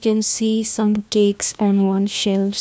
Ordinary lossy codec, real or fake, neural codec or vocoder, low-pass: none; fake; codec, 16 kHz, 1 kbps, FunCodec, trained on LibriTTS, 50 frames a second; none